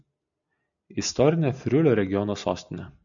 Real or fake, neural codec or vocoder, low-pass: real; none; 7.2 kHz